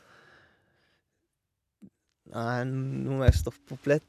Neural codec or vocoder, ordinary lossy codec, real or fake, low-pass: none; none; real; 14.4 kHz